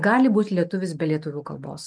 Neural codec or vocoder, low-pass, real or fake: none; 9.9 kHz; real